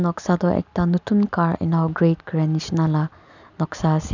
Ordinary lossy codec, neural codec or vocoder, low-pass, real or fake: none; none; 7.2 kHz; real